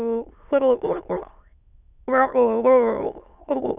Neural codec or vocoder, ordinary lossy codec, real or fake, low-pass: autoencoder, 22.05 kHz, a latent of 192 numbers a frame, VITS, trained on many speakers; none; fake; 3.6 kHz